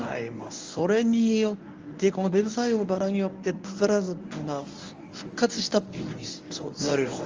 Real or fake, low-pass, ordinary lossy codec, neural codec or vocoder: fake; 7.2 kHz; Opus, 32 kbps; codec, 24 kHz, 0.9 kbps, WavTokenizer, medium speech release version 1